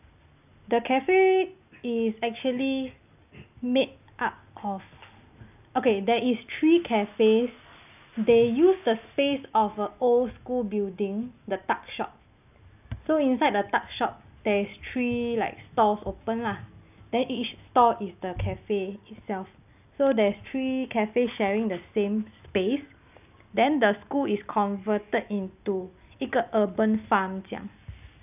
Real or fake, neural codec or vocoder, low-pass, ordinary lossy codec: real; none; 3.6 kHz; none